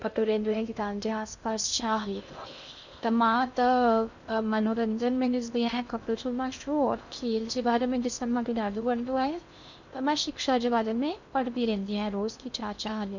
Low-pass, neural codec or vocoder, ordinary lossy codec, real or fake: 7.2 kHz; codec, 16 kHz in and 24 kHz out, 0.6 kbps, FocalCodec, streaming, 2048 codes; none; fake